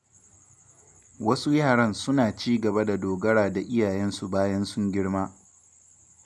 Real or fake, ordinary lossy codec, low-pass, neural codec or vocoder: real; none; none; none